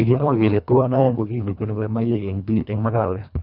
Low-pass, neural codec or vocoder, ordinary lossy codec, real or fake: 5.4 kHz; codec, 24 kHz, 1.5 kbps, HILCodec; none; fake